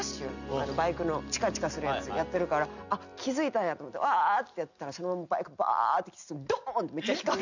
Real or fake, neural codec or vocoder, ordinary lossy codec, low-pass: real; none; none; 7.2 kHz